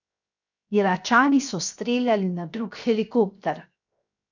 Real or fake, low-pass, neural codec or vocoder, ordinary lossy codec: fake; 7.2 kHz; codec, 16 kHz, 0.7 kbps, FocalCodec; none